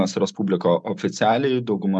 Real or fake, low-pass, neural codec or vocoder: real; 10.8 kHz; none